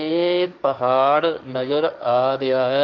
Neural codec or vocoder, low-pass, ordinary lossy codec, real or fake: codec, 16 kHz, 1.1 kbps, Voila-Tokenizer; 7.2 kHz; none; fake